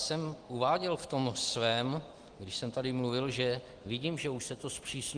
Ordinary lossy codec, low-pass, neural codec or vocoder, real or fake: Opus, 24 kbps; 9.9 kHz; none; real